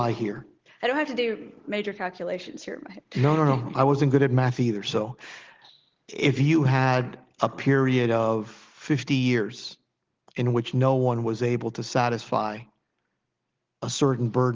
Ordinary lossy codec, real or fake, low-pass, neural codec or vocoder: Opus, 16 kbps; real; 7.2 kHz; none